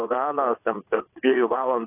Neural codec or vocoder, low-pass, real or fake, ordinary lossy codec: vocoder, 22.05 kHz, 80 mel bands, WaveNeXt; 3.6 kHz; fake; AAC, 24 kbps